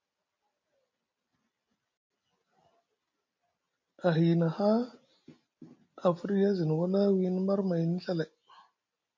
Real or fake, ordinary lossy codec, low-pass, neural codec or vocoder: real; MP3, 48 kbps; 7.2 kHz; none